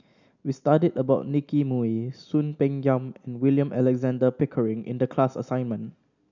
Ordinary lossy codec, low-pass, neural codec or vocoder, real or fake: none; 7.2 kHz; none; real